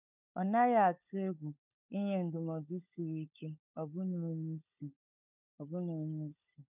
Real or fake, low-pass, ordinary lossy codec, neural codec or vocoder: fake; 3.6 kHz; none; codec, 16 kHz, 16 kbps, FunCodec, trained on LibriTTS, 50 frames a second